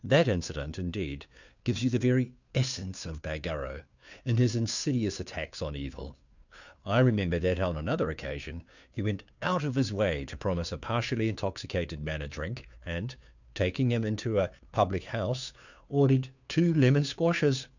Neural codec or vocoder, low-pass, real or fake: codec, 16 kHz, 2 kbps, FunCodec, trained on Chinese and English, 25 frames a second; 7.2 kHz; fake